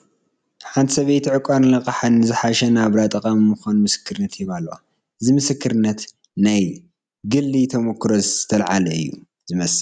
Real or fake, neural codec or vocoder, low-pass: real; none; 9.9 kHz